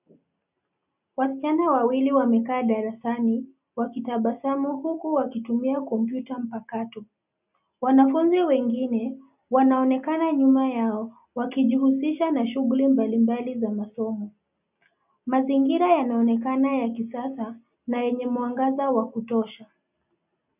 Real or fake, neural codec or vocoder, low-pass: real; none; 3.6 kHz